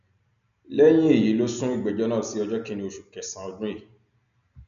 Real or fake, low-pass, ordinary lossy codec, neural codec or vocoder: real; 7.2 kHz; none; none